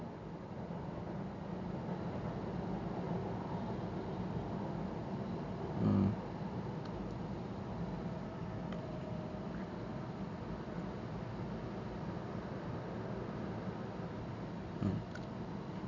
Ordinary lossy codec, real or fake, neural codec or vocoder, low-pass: none; real; none; 7.2 kHz